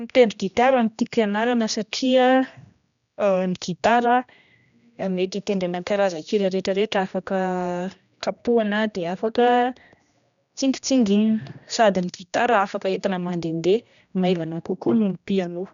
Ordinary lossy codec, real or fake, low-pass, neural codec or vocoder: none; fake; 7.2 kHz; codec, 16 kHz, 1 kbps, X-Codec, HuBERT features, trained on general audio